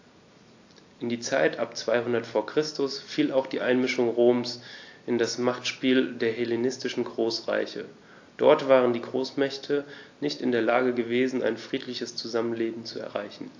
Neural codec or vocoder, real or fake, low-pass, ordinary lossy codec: none; real; 7.2 kHz; AAC, 48 kbps